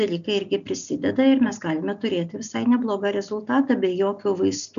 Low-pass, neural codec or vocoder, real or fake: 7.2 kHz; none; real